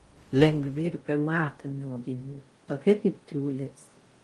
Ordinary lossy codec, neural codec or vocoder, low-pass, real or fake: Opus, 24 kbps; codec, 16 kHz in and 24 kHz out, 0.6 kbps, FocalCodec, streaming, 4096 codes; 10.8 kHz; fake